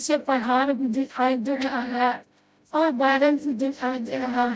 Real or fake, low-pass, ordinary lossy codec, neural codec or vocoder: fake; none; none; codec, 16 kHz, 0.5 kbps, FreqCodec, smaller model